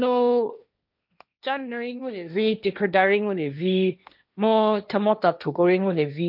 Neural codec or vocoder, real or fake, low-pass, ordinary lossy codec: codec, 16 kHz, 1.1 kbps, Voila-Tokenizer; fake; 5.4 kHz; none